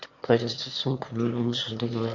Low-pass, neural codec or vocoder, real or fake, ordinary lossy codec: 7.2 kHz; autoencoder, 22.05 kHz, a latent of 192 numbers a frame, VITS, trained on one speaker; fake; MP3, 64 kbps